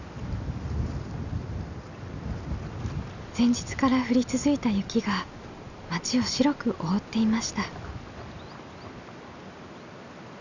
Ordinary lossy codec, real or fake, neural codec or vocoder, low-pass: none; real; none; 7.2 kHz